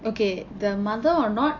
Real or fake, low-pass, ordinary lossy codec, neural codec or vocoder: real; 7.2 kHz; AAC, 48 kbps; none